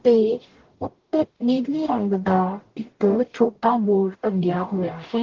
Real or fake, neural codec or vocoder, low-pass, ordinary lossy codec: fake; codec, 44.1 kHz, 0.9 kbps, DAC; 7.2 kHz; Opus, 16 kbps